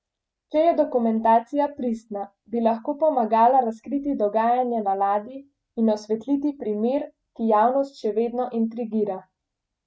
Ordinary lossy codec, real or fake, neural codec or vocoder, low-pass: none; real; none; none